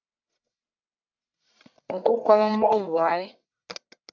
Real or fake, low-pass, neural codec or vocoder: fake; 7.2 kHz; codec, 44.1 kHz, 1.7 kbps, Pupu-Codec